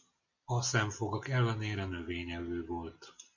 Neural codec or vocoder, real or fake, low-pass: none; real; 7.2 kHz